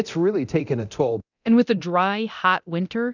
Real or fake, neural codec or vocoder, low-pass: fake; codec, 24 kHz, 0.9 kbps, DualCodec; 7.2 kHz